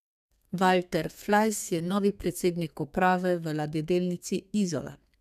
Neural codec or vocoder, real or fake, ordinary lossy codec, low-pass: codec, 32 kHz, 1.9 kbps, SNAC; fake; none; 14.4 kHz